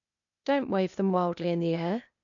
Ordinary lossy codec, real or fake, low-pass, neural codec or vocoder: none; fake; 7.2 kHz; codec, 16 kHz, 0.8 kbps, ZipCodec